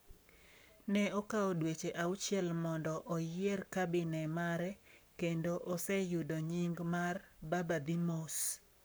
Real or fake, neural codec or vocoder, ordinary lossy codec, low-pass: fake; codec, 44.1 kHz, 7.8 kbps, Pupu-Codec; none; none